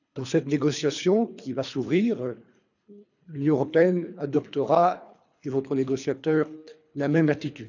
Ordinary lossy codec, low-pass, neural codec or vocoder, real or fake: none; 7.2 kHz; codec, 24 kHz, 3 kbps, HILCodec; fake